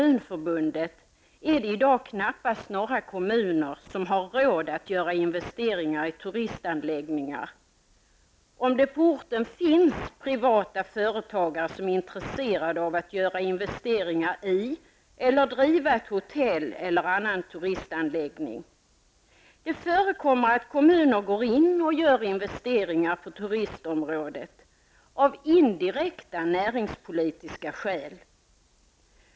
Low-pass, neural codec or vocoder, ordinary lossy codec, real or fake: none; none; none; real